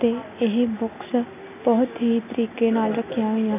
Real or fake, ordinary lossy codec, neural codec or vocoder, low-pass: real; none; none; 3.6 kHz